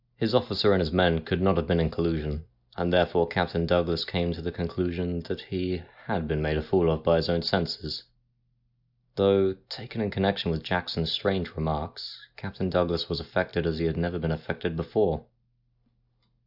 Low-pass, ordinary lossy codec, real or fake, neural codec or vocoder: 5.4 kHz; AAC, 48 kbps; real; none